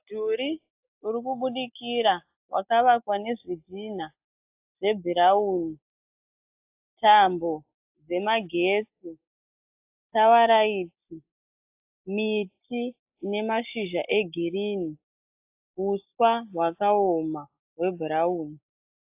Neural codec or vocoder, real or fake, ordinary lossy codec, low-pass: none; real; AAC, 32 kbps; 3.6 kHz